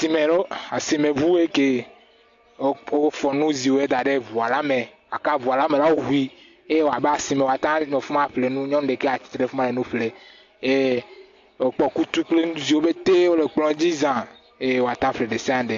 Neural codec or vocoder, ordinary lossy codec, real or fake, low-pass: none; AAC, 48 kbps; real; 7.2 kHz